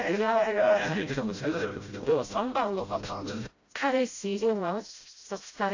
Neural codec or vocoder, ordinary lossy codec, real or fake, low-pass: codec, 16 kHz, 0.5 kbps, FreqCodec, smaller model; AAC, 48 kbps; fake; 7.2 kHz